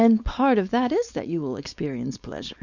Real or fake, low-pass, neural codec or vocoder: fake; 7.2 kHz; codec, 16 kHz, 4.8 kbps, FACodec